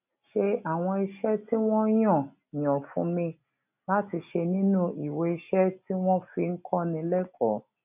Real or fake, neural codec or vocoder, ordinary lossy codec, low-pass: real; none; none; 3.6 kHz